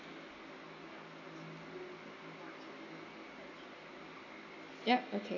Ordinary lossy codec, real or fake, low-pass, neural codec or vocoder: none; real; 7.2 kHz; none